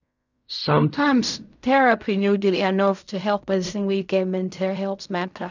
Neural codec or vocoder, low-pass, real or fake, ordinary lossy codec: codec, 16 kHz in and 24 kHz out, 0.4 kbps, LongCat-Audio-Codec, fine tuned four codebook decoder; 7.2 kHz; fake; none